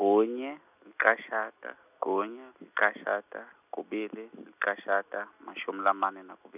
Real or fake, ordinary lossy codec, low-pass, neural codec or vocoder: real; none; 3.6 kHz; none